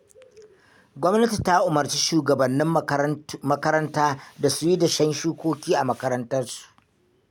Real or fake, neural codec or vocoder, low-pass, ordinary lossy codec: real; none; 19.8 kHz; none